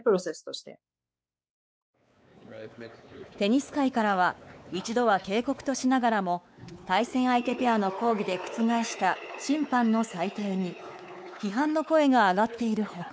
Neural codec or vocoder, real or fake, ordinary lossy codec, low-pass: codec, 16 kHz, 4 kbps, X-Codec, WavLM features, trained on Multilingual LibriSpeech; fake; none; none